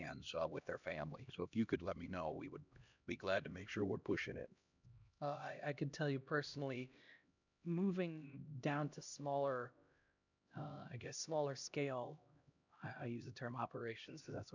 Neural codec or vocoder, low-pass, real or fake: codec, 16 kHz, 1 kbps, X-Codec, HuBERT features, trained on LibriSpeech; 7.2 kHz; fake